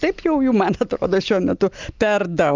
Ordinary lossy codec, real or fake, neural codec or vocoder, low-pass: Opus, 32 kbps; real; none; 7.2 kHz